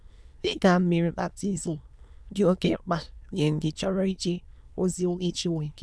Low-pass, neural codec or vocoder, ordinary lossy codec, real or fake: none; autoencoder, 22.05 kHz, a latent of 192 numbers a frame, VITS, trained on many speakers; none; fake